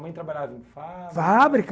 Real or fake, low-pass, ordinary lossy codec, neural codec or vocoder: real; none; none; none